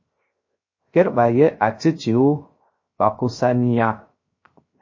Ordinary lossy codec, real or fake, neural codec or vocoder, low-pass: MP3, 32 kbps; fake; codec, 16 kHz, 0.3 kbps, FocalCodec; 7.2 kHz